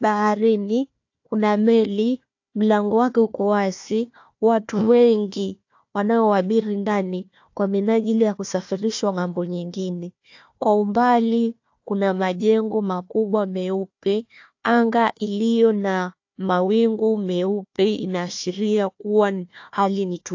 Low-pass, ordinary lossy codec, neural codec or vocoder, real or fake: 7.2 kHz; AAC, 48 kbps; codec, 16 kHz, 1 kbps, FunCodec, trained on Chinese and English, 50 frames a second; fake